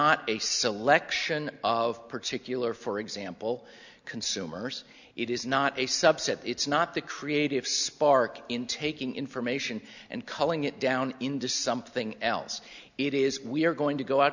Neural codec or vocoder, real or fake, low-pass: none; real; 7.2 kHz